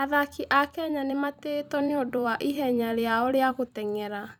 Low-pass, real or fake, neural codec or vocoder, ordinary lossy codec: 19.8 kHz; fake; vocoder, 44.1 kHz, 128 mel bands every 256 samples, BigVGAN v2; none